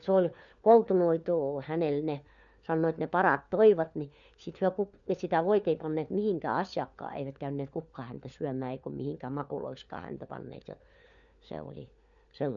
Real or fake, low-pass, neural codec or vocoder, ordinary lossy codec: fake; 7.2 kHz; codec, 16 kHz, 4 kbps, FunCodec, trained on LibriTTS, 50 frames a second; none